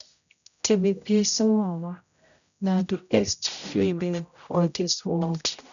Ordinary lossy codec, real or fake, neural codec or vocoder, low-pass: none; fake; codec, 16 kHz, 0.5 kbps, X-Codec, HuBERT features, trained on general audio; 7.2 kHz